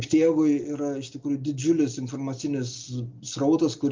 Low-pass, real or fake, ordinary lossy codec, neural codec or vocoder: 7.2 kHz; real; Opus, 24 kbps; none